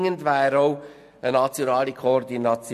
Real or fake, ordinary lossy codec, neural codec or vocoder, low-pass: real; MP3, 64 kbps; none; 14.4 kHz